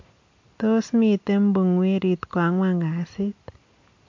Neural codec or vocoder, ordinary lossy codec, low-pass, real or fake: none; MP3, 48 kbps; 7.2 kHz; real